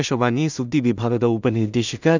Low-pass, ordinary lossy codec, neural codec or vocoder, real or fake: 7.2 kHz; none; codec, 16 kHz in and 24 kHz out, 0.4 kbps, LongCat-Audio-Codec, two codebook decoder; fake